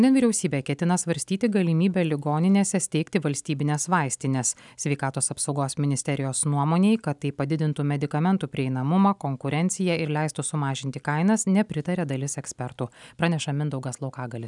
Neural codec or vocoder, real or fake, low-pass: none; real; 10.8 kHz